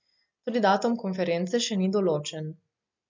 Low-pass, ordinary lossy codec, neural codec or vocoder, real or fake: 7.2 kHz; MP3, 64 kbps; none; real